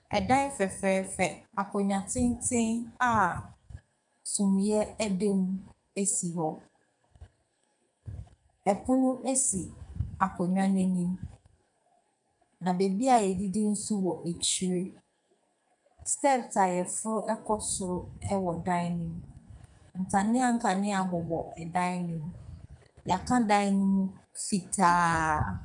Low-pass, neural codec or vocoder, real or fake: 10.8 kHz; codec, 44.1 kHz, 2.6 kbps, SNAC; fake